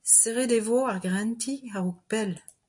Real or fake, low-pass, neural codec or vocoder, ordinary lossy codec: real; 10.8 kHz; none; AAC, 64 kbps